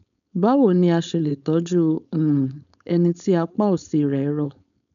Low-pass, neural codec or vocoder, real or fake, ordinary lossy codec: 7.2 kHz; codec, 16 kHz, 4.8 kbps, FACodec; fake; none